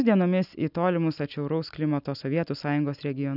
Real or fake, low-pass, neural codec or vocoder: real; 5.4 kHz; none